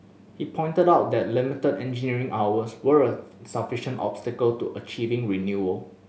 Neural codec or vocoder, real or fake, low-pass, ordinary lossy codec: none; real; none; none